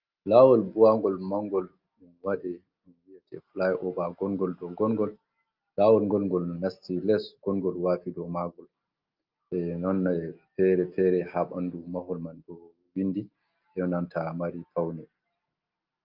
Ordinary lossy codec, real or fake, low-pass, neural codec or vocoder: Opus, 24 kbps; real; 5.4 kHz; none